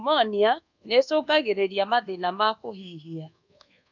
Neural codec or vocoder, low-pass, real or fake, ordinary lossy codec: codec, 24 kHz, 1.2 kbps, DualCodec; 7.2 kHz; fake; none